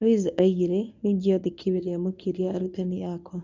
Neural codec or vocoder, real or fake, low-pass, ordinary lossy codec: codec, 24 kHz, 0.9 kbps, WavTokenizer, medium speech release version 1; fake; 7.2 kHz; none